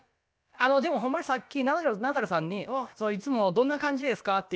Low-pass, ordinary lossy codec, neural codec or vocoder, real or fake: none; none; codec, 16 kHz, about 1 kbps, DyCAST, with the encoder's durations; fake